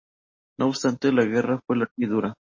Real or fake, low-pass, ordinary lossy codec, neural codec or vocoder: real; 7.2 kHz; MP3, 32 kbps; none